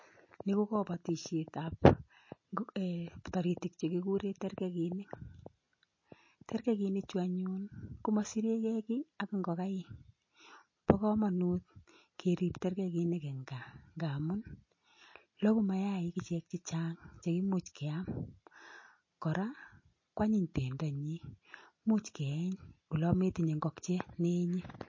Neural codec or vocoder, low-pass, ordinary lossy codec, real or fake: none; 7.2 kHz; MP3, 32 kbps; real